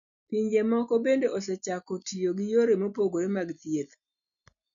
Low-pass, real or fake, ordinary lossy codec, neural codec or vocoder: 7.2 kHz; real; none; none